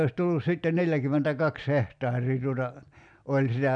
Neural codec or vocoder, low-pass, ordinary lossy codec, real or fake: none; 9.9 kHz; none; real